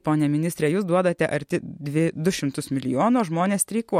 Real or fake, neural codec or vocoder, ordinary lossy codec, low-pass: real; none; MP3, 64 kbps; 19.8 kHz